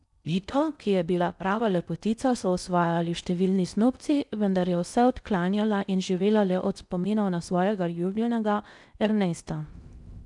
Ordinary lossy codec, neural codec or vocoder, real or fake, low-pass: none; codec, 16 kHz in and 24 kHz out, 0.6 kbps, FocalCodec, streaming, 4096 codes; fake; 10.8 kHz